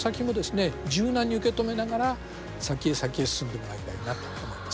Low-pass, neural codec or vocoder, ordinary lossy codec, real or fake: none; none; none; real